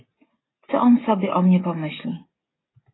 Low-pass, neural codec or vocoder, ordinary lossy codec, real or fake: 7.2 kHz; none; AAC, 16 kbps; real